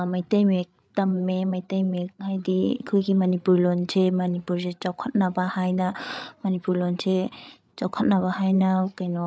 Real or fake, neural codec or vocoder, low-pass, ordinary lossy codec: fake; codec, 16 kHz, 8 kbps, FreqCodec, larger model; none; none